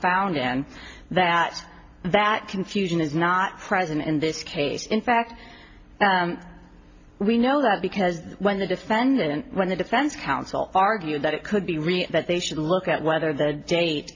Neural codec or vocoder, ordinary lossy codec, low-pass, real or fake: none; AAC, 48 kbps; 7.2 kHz; real